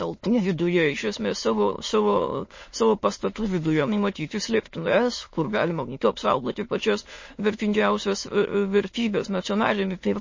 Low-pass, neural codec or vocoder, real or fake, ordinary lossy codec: 7.2 kHz; autoencoder, 22.05 kHz, a latent of 192 numbers a frame, VITS, trained on many speakers; fake; MP3, 32 kbps